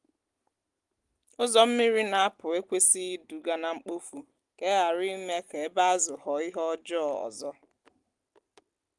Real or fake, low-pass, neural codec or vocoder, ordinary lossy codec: real; 10.8 kHz; none; Opus, 24 kbps